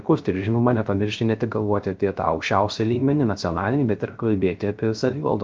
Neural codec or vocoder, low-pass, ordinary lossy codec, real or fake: codec, 16 kHz, 0.3 kbps, FocalCodec; 7.2 kHz; Opus, 32 kbps; fake